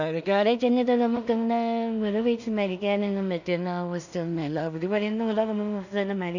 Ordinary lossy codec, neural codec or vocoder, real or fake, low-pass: none; codec, 16 kHz in and 24 kHz out, 0.4 kbps, LongCat-Audio-Codec, two codebook decoder; fake; 7.2 kHz